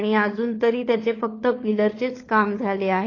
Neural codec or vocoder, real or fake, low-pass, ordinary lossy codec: codec, 16 kHz, 4 kbps, FunCodec, trained on LibriTTS, 50 frames a second; fake; 7.2 kHz; AAC, 32 kbps